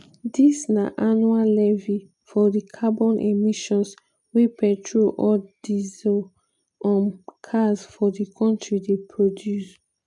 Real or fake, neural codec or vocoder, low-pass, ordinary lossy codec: real; none; 10.8 kHz; none